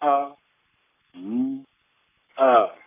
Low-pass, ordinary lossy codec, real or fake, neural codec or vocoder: 3.6 kHz; none; fake; codec, 44.1 kHz, 7.8 kbps, Pupu-Codec